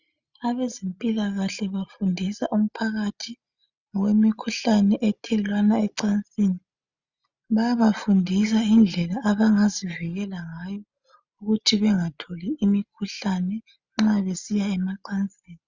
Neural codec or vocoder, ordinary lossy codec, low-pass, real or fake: none; Opus, 64 kbps; 7.2 kHz; real